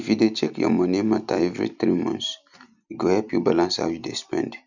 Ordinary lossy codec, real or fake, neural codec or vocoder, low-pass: none; real; none; 7.2 kHz